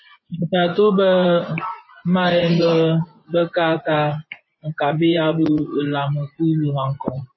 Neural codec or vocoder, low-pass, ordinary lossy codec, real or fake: vocoder, 24 kHz, 100 mel bands, Vocos; 7.2 kHz; MP3, 24 kbps; fake